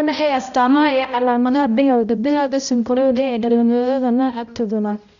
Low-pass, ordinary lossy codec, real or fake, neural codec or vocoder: 7.2 kHz; none; fake; codec, 16 kHz, 0.5 kbps, X-Codec, HuBERT features, trained on balanced general audio